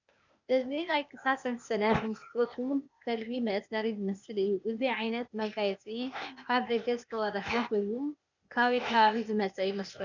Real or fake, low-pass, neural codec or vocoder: fake; 7.2 kHz; codec, 16 kHz, 0.8 kbps, ZipCodec